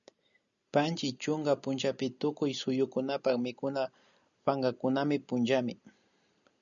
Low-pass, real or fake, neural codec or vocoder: 7.2 kHz; real; none